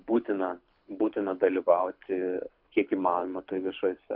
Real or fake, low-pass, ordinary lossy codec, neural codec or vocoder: fake; 5.4 kHz; AAC, 48 kbps; codec, 24 kHz, 6 kbps, HILCodec